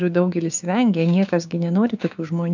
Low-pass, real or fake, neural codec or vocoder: 7.2 kHz; fake; codec, 16 kHz, 6 kbps, DAC